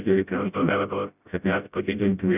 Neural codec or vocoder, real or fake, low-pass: codec, 16 kHz, 0.5 kbps, FreqCodec, smaller model; fake; 3.6 kHz